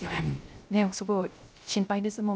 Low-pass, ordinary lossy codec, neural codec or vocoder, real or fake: none; none; codec, 16 kHz, 0.3 kbps, FocalCodec; fake